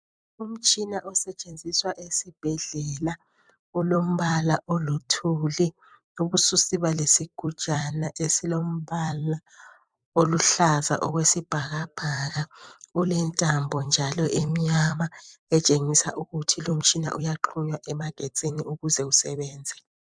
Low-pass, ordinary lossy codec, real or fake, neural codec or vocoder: 9.9 kHz; MP3, 96 kbps; fake; vocoder, 44.1 kHz, 128 mel bands every 256 samples, BigVGAN v2